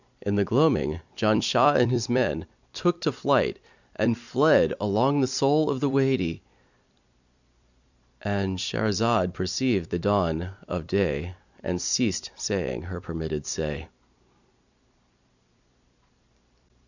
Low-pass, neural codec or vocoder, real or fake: 7.2 kHz; vocoder, 44.1 kHz, 128 mel bands every 256 samples, BigVGAN v2; fake